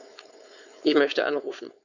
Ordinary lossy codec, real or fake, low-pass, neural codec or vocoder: none; fake; 7.2 kHz; codec, 16 kHz, 4.8 kbps, FACodec